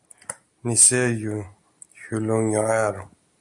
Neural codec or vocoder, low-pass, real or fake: none; 10.8 kHz; real